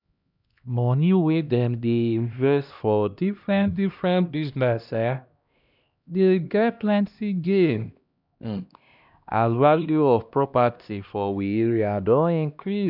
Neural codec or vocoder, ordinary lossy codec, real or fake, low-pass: codec, 16 kHz, 1 kbps, X-Codec, HuBERT features, trained on LibriSpeech; none; fake; 5.4 kHz